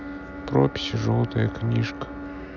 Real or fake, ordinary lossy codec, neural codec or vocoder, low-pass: real; none; none; 7.2 kHz